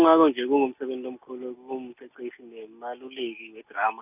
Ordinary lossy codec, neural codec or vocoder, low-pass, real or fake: none; none; 3.6 kHz; real